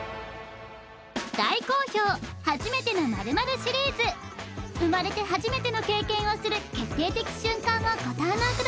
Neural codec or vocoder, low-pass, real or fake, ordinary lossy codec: none; none; real; none